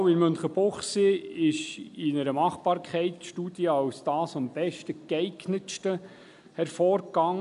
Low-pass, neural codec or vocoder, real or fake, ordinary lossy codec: 10.8 kHz; none; real; none